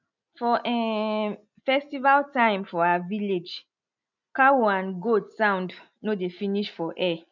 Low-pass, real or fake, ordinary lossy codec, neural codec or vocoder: 7.2 kHz; real; none; none